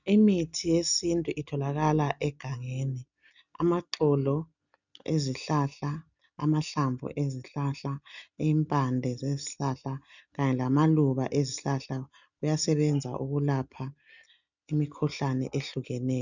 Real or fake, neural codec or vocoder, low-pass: real; none; 7.2 kHz